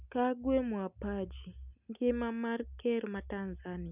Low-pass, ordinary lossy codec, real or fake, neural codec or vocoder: 3.6 kHz; none; real; none